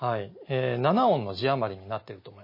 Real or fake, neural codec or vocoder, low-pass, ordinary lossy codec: real; none; 5.4 kHz; MP3, 24 kbps